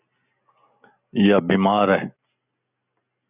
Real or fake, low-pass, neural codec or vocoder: real; 3.6 kHz; none